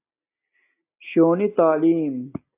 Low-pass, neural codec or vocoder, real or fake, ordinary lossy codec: 3.6 kHz; none; real; AAC, 24 kbps